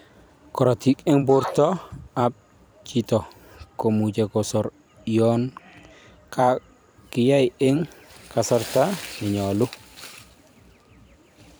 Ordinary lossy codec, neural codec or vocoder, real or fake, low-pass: none; vocoder, 44.1 kHz, 128 mel bands every 256 samples, BigVGAN v2; fake; none